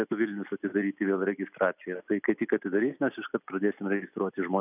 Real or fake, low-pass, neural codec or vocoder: real; 3.6 kHz; none